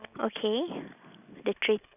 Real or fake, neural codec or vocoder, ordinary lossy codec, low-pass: real; none; none; 3.6 kHz